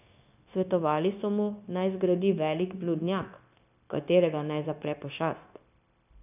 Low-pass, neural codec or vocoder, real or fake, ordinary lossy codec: 3.6 kHz; codec, 16 kHz, 0.9 kbps, LongCat-Audio-Codec; fake; none